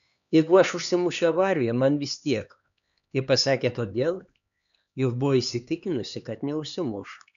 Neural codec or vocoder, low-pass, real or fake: codec, 16 kHz, 2 kbps, X-Codec, HuBERT features, trained on LibriSpeech; 7.2 kHz; fake